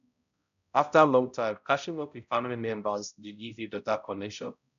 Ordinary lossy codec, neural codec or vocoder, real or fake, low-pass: none; codec, 16 kHz, 0.5 kbps, X-Codec, HuBERT features, trained on balanced general audio; fake; 7.2 kHz